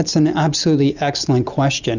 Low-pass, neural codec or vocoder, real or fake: 7.2 kHz; none; real